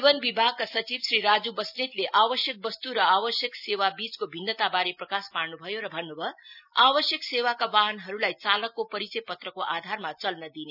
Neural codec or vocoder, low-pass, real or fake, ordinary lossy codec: none; 5.4 kHz; real; none